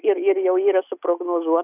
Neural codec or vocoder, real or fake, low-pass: none; real; 3.6 kHz